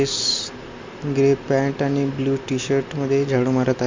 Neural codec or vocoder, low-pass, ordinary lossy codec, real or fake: none; 7.2 kHz; MP3, 48 kbps; real